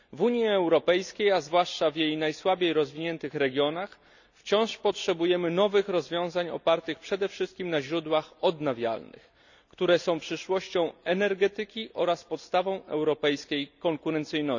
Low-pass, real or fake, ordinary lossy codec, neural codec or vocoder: 7.2 kHz; real; none; none